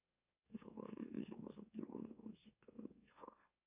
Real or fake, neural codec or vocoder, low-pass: fake; autoencoder, 44.1 kHz, a latent of 192 numbers a frame, MeloTTS; 3.6 kHz